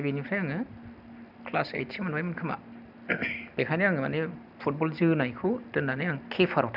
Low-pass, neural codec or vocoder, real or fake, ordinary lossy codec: 5.4 kHz; none; real; none